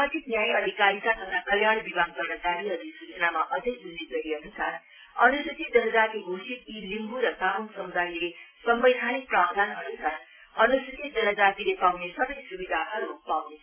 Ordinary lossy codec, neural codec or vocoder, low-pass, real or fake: MP3, 16 kbps; none; 3.6 kHz; real